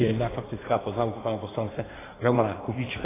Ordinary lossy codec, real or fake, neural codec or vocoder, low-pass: AAC, 16 kbps; fake; codec, 16 kHz in and 24 kHz out, 1.1 kbps, FireRedTTS-2 codec; 3.6 kHz